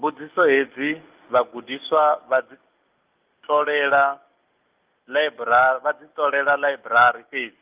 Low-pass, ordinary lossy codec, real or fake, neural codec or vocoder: 3.6 kHz; Opus, 32 kbps; real; none